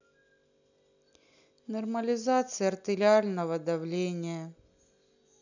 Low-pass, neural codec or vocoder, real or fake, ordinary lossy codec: 7.2 kHz; none; real; none